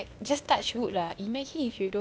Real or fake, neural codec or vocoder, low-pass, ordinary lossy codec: fake; codec, 16 kHz, 0.8 kbps, ZipCodec; none; none